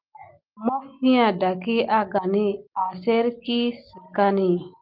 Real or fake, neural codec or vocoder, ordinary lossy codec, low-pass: real; none; Opus, 24 kbps; 5.4 kHz